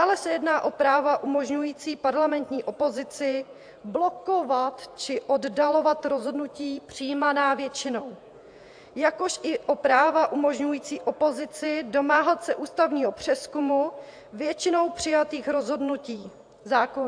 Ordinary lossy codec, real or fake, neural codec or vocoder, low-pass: AAC, 64 kbps; fake; vocoder, 48 kHz, 128 mel bands, Vocos; 9.9 kHz